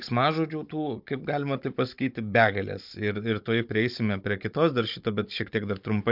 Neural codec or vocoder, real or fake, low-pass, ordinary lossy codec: codec, 16 kHz, 16 kbps, FunCodec, trained on Chinese and English, 50 frames a second; fake; 5.4 kHz; MP3, 48 kbps